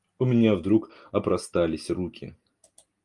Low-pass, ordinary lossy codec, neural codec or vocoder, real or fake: 10.8 kHz; Opus, 32 kbps; none; real